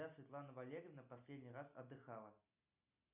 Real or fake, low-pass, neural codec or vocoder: real; 3.6 kHz; none